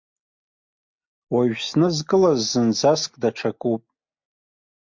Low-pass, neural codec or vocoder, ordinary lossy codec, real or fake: 7.2 kHz; none; MP3, 64 kbps; real